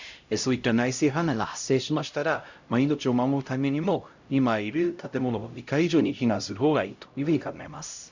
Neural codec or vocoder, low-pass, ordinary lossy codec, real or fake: codec, 16 kHz, 0.5 kbps, X-Codec, HuBERT features, trained on LibriSpeech; 7.2 kHz; Opus, 64 kbps; fake